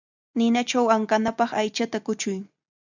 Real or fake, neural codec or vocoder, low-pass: real; none; 7.2 kHz